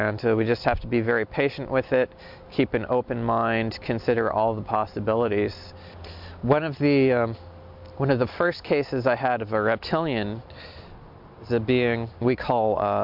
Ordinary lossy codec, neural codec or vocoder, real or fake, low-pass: MP3, 48 kbps; none; real; 5.4 kHz